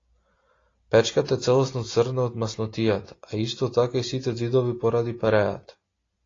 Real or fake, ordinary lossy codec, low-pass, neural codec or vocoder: real; AAC, 32 kbps; 7.2 kHz; none